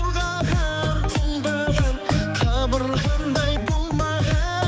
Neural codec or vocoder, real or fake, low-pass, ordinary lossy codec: codec, 16 kHz, 4 kbps, X-Codec, HuBERT features, trained on balanced general audio; fake; none; none